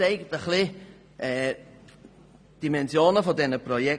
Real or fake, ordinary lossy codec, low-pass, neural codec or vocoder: real; none; 9.9 kHz; none